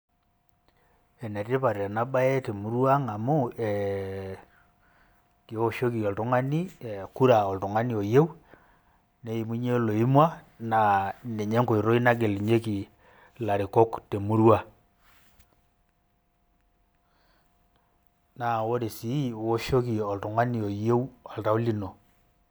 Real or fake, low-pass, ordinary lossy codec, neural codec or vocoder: real; none; none; none